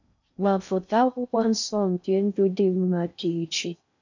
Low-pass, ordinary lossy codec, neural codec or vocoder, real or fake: 7.2 kHz; none; codec, 16 kHz in and 24 kHz out, 0.6 kbps, FocalCodec, streaming, 2048 codes; fake